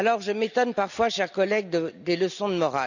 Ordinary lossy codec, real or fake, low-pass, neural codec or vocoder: none; real; 7.2 kHz; none